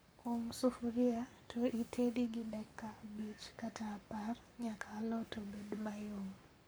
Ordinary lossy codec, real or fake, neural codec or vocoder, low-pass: none; fake; codec, 44.1 kHz, 7.8 kbps, Pupu-Codec; none